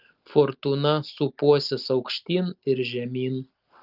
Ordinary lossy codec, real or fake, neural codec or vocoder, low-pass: Opus, 24 kbps; real; none; 5.4 kHz